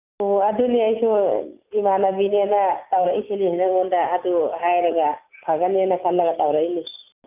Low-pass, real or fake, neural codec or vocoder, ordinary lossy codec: 3.6 kHz; real; none; none